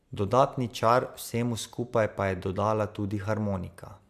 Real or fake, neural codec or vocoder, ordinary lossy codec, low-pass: real; none; AAC, 96 kbps; 14.4 kHz